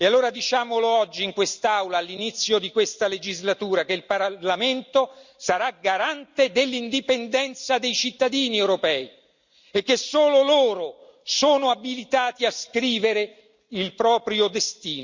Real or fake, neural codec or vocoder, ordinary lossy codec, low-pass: real; none; Opus, 64 kbps; 7.2 kHz